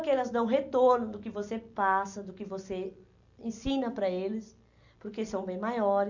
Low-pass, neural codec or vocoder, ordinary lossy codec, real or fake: 7.2 kHz; none; none; real